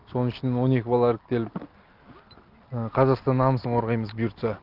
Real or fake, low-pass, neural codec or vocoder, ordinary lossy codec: real; 5.4 kHz; none; Opus, 32 kbps